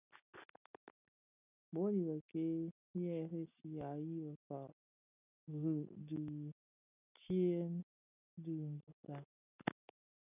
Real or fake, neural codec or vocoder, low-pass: real; none; 3.6 kHz